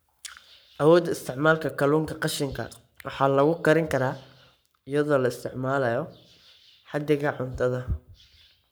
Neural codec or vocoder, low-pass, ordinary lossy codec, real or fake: codec, 44.1 kHz, 7.8 kbps, Pupu-Codec; none; none; fake